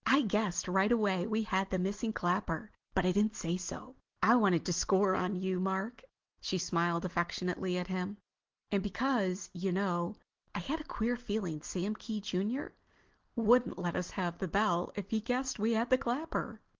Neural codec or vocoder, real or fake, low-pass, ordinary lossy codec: none; real; 7.2 kHz; Opus, 16 kbps